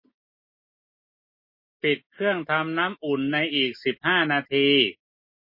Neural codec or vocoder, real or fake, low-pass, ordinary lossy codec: none; real; 5.4 kHz; MP3, 24 kbps